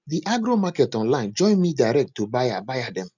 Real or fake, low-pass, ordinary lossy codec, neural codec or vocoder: real; 7.2 kHz; none; none